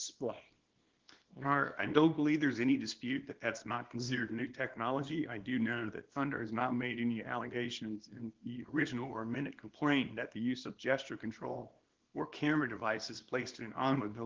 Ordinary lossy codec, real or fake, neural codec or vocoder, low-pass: Opus, 16 kbps; fake; codec, 24 kHz, 0.9 kbps, WavTokenizer, small release; 7.2 kHz